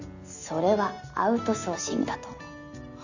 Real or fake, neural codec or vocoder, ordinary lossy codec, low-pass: real; none; none; 7.2 kHz